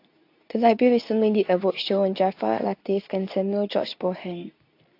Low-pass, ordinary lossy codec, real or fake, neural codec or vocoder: 5.4 kHz; AAC, 32 kbps; fake; codec, 24 kHz, 0.9 kbps, WavTokenizer, medium speech release version 2